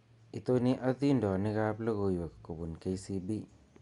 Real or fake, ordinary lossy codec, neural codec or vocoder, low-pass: real; Opus, 64 kbps; none; 10.8 kHz